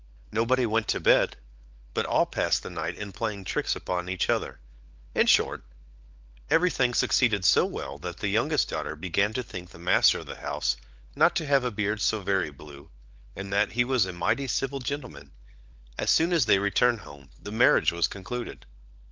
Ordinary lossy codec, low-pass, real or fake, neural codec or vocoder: Opus, 32 kbps; 7.2 kHz; fake; codec, 16 kHz, 16 kbps, FunCodec, trained on LibriTTS, 50 frames a second